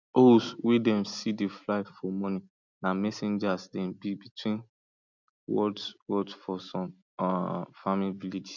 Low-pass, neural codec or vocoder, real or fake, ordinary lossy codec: 7.2 kHz; none; real; none